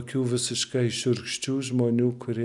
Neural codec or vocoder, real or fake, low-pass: none; real; 10.8 kHz